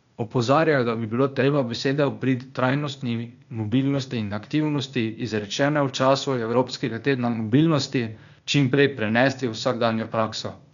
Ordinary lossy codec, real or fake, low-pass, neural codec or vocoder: none; fake; 7.2 kHz; codec, 16 kHz, 0.8 kbps, ZipCodec